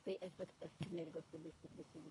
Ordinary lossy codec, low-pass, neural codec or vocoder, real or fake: AAC, 64 kbps; 10.8 kHz; codec, 24 kHz, 3 kbps, HILCodec; fake